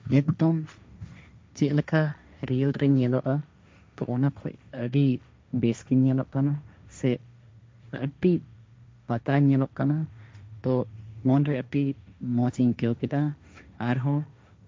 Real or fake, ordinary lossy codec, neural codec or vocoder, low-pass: fake; none; codec, 16 kHz, 1.1 kbps, Voila-Tokenizer; none